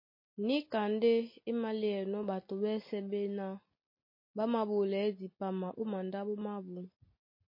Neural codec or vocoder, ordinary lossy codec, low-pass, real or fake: none; MP3, 24 kbps; 5.4 kHz; real